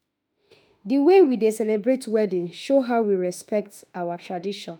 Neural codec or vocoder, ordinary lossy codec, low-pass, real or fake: autoencoder, 48 kHz, 32 numbers a frame, DAC-VAE, trained on Japanese speech; none; none; fake